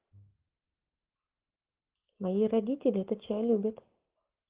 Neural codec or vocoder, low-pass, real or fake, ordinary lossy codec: none; 3.6 kHz; real; Opus, 16 kbps